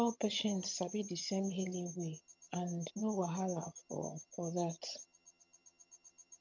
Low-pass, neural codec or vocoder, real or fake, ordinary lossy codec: 7.2 kHz; vocoder, 22.05 kHz, 80 mel bands, HiFi-GAN; fake; MP3, 64 kbps